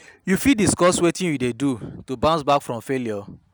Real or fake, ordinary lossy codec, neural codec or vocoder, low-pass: real; none; none; none